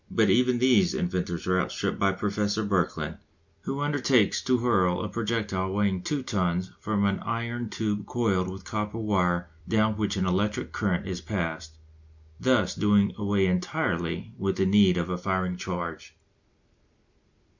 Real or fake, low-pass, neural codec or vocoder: real; 7.2 kHz; none